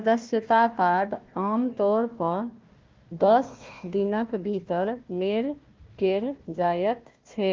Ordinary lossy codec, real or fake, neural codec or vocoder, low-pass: Opus, 32 kbps; fake; codec, 16 kHz, 1 kbps, FunCodec, trained on Chinese and English, 50 frames a second; 7.2 kHz